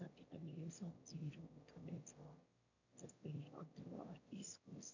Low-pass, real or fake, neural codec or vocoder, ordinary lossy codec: 7.2 kHz; fake; autoencoder, 22.05 kHz, a latent of 192 numbers a frame, VITS, trained on one speaker; none